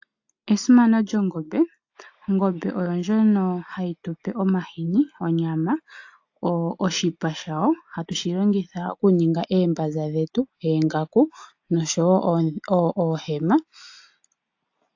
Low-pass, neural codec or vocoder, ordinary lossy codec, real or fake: 7.2 kHz; none; AAC, 48 kbps; real